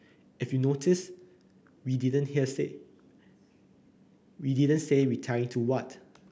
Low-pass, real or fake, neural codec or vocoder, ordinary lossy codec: none; real; none; none